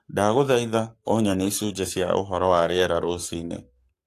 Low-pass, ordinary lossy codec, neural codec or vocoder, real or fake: 14.4 kHz; AAC, 64 kbps; codec, 44.1 kHz, 7.8 kbps, Pupu-Codec; fake